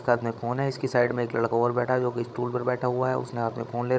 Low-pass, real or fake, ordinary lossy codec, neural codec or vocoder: none; fake; none; codec, 16 kHz, 16 kbps, FreqCodec, larger model